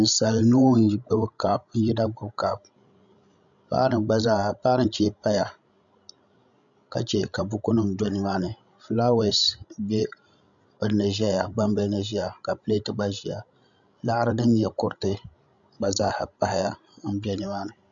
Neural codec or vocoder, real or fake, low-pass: codec, 16 kHz, 16 kbps, FreqCodec, larger model; fake; 7.2 kHz